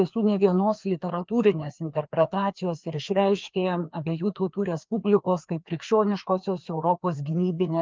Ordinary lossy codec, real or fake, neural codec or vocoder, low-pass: Opus, 32 kbps; fake; codec, 16 kHz, 2 kbps, FreqCodec, larger model; 7.2 kHz